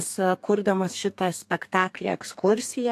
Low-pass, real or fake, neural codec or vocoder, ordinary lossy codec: 14.4 kHz; fake; codec, 32 kHz, 1.9 kbps, SNAC; AAC, 64 kbps